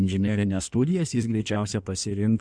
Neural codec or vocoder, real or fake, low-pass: codec, 16 kHz in and 24 kHz out, 1.1 kbps, FireRedTTS-2 codec; fake; 9.9 kHz